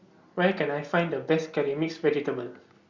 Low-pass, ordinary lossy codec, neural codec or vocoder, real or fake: 7.2 kHz; Opus, 64 kbps; none; real